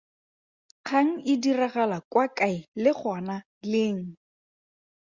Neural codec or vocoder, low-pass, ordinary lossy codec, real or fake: none; 7.2 kHz; Opus, 64 kbps; real